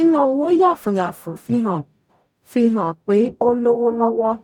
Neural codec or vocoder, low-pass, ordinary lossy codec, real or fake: codec, 44.1 kHz, 0.9 kbps, DAC; 19.8 kHz; none; fake